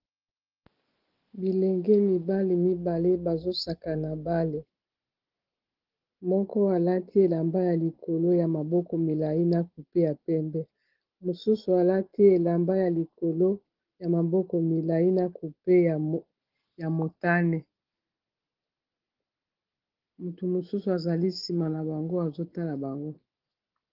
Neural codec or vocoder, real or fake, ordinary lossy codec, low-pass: none; real; Opus, 16 kbps; 5.4 kHz